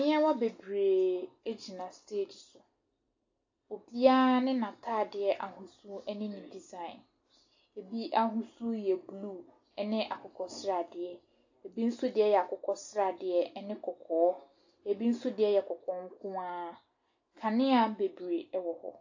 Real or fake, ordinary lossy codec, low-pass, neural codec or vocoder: real; AAC, 48 kbps; 7.2 kHz; none